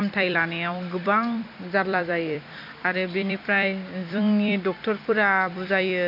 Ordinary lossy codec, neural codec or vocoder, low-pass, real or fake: none; vocoder, 44.1 kHz, 128 mel bands every 256 samples, BigVGAN v2; 5.4 kHz; fake